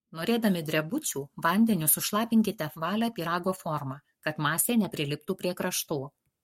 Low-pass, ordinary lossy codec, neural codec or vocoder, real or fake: 19.8 kHz; MP3, 64 kbps; codec, 44.1 kHz, 7.8 kbps, Pupu-Codec; fake